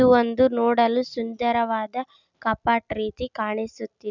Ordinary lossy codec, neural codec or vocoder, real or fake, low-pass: none; none; real; 7.2 kHz